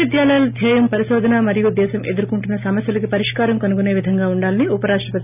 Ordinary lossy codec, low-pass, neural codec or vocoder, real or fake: none; 3.6 kHz; none; real